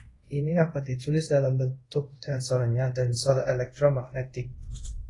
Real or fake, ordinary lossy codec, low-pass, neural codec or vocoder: fake; AAC, 32 kbps; 10.8 kHz; codec, 24 kHz, 0.5 kbps, DualCodec